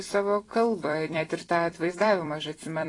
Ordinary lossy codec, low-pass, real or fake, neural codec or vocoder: AAC, 32 kbps; 10.8 kHz; fake; vocoder, 44.1 kHz, 128 mel bands every 256 samples, BigVGAN v2